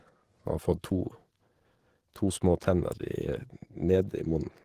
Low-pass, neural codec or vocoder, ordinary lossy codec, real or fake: 14.4 kHz; codec, 44.1 kHz, 7.8 kbps, DAC; Opus, 16 kbps; fake